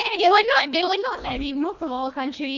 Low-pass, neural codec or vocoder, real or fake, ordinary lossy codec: 7.2 kHz; codec, 24 kHz, 1.5 kbps, HILCodec; fake; Opus, 64 kbps